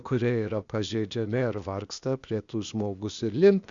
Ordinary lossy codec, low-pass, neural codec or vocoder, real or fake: Opus, 64 kbps; 7.2 kHz; codec, 16 kHz, 0.8 kbps, ZipCodec; fake